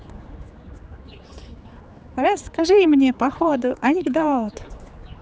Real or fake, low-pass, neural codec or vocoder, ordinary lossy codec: fake; none; codec, 16 kHz, 4 kbps, X-Codec, HuBERT features, trained on general audio; none